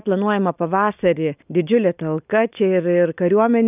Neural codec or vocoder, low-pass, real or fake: none; 3.6 kHz; real